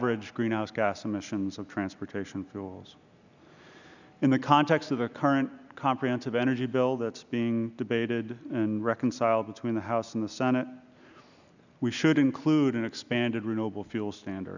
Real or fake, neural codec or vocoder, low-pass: real; none; 7.2 kHz